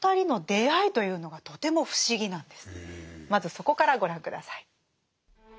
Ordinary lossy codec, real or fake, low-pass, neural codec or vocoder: none; real; none; none